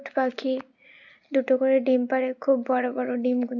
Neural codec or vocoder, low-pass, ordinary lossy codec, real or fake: none; 7.2 kHz; none; real